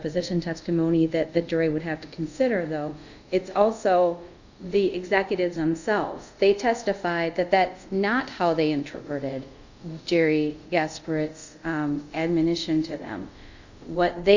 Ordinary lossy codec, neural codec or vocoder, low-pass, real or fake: Opus, 64 kbps; codec, 24 kHz, 0.5 kbps, DualCodec; 7.2 kHz; fake